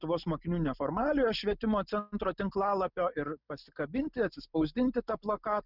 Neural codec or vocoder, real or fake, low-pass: none; real; 5.4 kHz